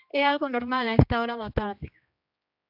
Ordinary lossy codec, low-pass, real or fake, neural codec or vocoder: AAC, 48 kbps; 5.4 kHz; fake; codec, 16 kHz, 2 kbps, X-Codec, HuBERT features, trained on general audio